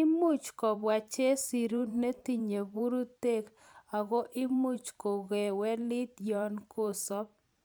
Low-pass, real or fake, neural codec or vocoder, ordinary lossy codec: none; fake; vocoder, 44.1 kHz, 128 mel bands every 512 samples, BigVGAN v2; none